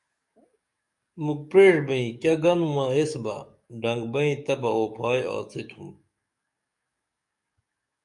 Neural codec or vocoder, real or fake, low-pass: codec, 44.1 kHz, 7.8 kbps, DAC; fake; 10.8 kHz